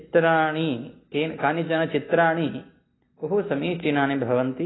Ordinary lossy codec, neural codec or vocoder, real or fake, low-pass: AAC, 16 kbps; none; real; 7.2 kHz